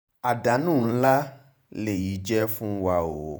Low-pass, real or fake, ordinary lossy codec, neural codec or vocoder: none; fake; none; vocoder, 48 kHz, 128 mel bands, Vocos